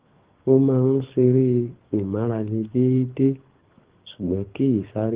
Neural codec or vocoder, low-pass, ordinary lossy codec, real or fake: codec, 24 kHz, 6 kbps, HILCodec; 3.6 kHz; Opus, 16 kbps; fake